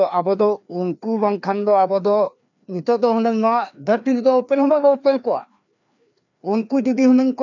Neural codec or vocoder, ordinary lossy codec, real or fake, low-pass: codec, 16 kHz, 2 kbps, FreqCodec, larger model; none; fake; 7.2 kHz